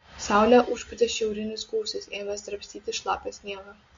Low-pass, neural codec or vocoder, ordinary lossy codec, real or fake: 7.2 kHz; none; MP3, 48 kbps; real